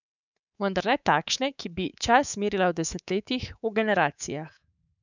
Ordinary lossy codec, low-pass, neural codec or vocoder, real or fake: none; 7.2 kHz; codec, 24 kHz, 3.1 kbps, DualCodec; fake